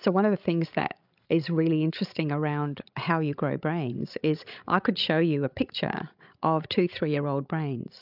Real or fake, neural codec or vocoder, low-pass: fake; codec, 16 kHz, 16 kbps, FreqCodec, larger model; 5.4 kHz